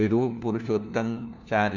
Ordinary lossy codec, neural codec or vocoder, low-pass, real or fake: none; codec, 16 kHz, 1 kbps, FunCodec, trained on LibriTTS, 50 frames a second; 7.2 kHz; fake